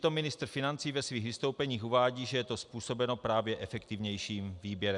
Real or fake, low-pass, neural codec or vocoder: real; 10.8 kHz; none